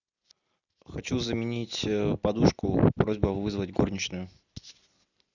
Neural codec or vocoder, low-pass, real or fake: none; 7.2 kHz; real